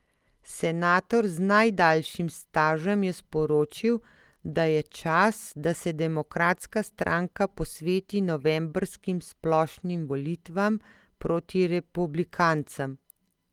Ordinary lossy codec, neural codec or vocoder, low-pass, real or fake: Opus, 24 kbps; none; 19.8 kHz; real